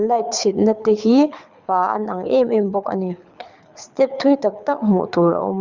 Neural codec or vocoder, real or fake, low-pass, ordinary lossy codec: codec, 24 kHz, 6 kbps, HILCodec; fake; 7.2 kHz; Opus, 64 kbps